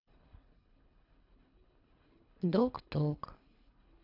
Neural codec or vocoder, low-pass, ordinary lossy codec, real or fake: codec, 24 kHz, 3 kbps, HILCodec; 5.4 kHz; none; fake